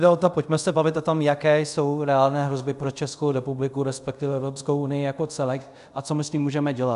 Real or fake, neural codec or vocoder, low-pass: fake; codec, 24 kHz, 0.5 kbps, DualCodec; 10.8 kHz